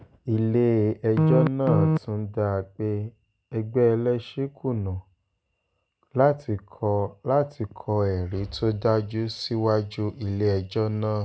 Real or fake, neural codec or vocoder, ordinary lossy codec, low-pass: real; none; none; none